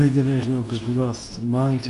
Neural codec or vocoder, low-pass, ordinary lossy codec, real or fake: codec, 24 kHz, 0.9 kbps, WavTokenizer, medium speech release version 2; 10.8 kHz; AAC, 96 kbps; fake